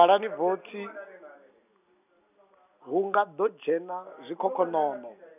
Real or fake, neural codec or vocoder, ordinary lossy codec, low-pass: real; none; none; 3.6 kHz